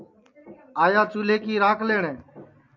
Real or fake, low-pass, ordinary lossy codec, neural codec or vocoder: real; 7.2 kHz; MP3, 64 kbps; none